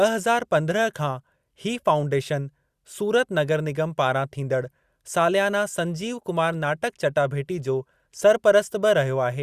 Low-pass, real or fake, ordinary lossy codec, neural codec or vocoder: 14.4 kHz; real; Opus, 64 kbps; none